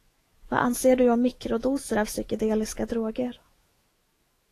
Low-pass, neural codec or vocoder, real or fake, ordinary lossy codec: 14.4 kHz; autoencoder, 48 kHz, 128 numbers a frame, DAC-VAE, trained on Japanese speech; fake; AAC, 48 kbps